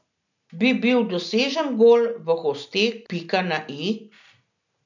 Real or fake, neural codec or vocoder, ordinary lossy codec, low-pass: real; none; none; 7.2 kHz